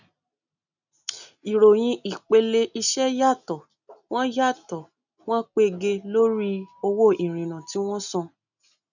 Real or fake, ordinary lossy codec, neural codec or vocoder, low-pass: real; none; none; 7.2 kHz